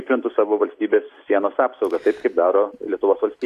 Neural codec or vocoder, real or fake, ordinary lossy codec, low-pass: none; real; AAC, 64 kbps; 14.4 kHz